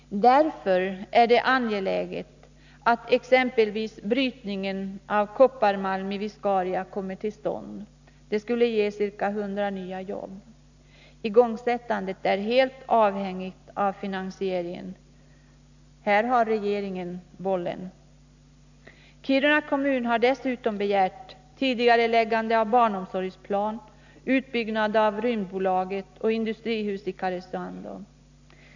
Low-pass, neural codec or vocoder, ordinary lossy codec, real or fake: 7.2 kHz; none; none; real